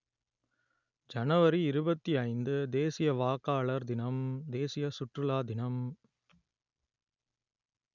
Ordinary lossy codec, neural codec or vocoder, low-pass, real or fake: none; none; 7.2 kHz; real